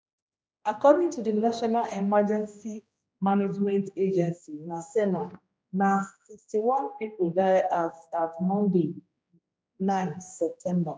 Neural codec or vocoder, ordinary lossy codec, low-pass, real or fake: codec, 16 kHz, 1 kbps, X-Codec, HuBERT features, trained on general audio; none; none; fake